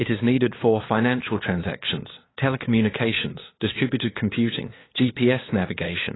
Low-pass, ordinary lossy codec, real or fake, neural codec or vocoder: 7.2 kHz; AAC, 16 kbps; fake; codec, 16 kHz, 2 kbps, FunCodec, trained on LibriTTS, 25 frames a second